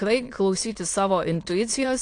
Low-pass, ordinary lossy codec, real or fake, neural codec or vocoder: 9.9 kHz; AAC, 48 kbps; fake; autoencoder, 22.05 kHz, a latent of 192 numbers a frame, VITS, trained on many speakers